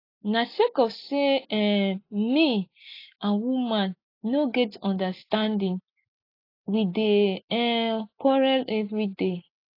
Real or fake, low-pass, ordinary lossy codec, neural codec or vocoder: real; 5.4 kHz; AAC, 32 kbps; none